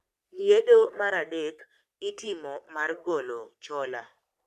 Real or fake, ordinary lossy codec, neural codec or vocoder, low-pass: fake; none; codec, 44.1 kHz, 3.4 kbps, Pupu-Codec; 14.4 kHz